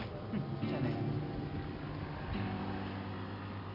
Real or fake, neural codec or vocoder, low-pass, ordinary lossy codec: real; none; 5.4 kHz; none